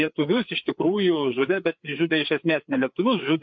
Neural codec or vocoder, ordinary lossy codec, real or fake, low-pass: codec, 16 kHz, 4 kbps, FreqCodec, larger model; MP3, 48 kbps; fake; 7.2 kHz